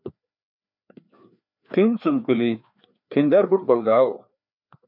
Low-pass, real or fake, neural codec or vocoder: 5.4 kHz; fake; codec, 16 kHz, 2 kbps, FreqCodec, larger model